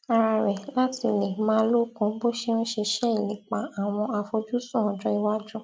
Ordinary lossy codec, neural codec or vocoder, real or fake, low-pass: none; none; real; none